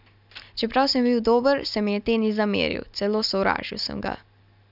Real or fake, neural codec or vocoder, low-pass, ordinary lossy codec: real; none; 5.4 kHz; none